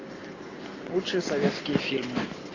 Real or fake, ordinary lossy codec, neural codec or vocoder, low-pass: real; AAC, 32 kbps; none; 7.2 kHz